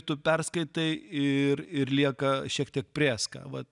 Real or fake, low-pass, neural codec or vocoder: real; 10.8 kHz; none